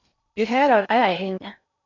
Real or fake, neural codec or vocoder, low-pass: fake; codec, 16 kHz in and 24 kHz out, 0.6 kbps, FocalCodec, streaming, 2048 codes; 7.2 kHz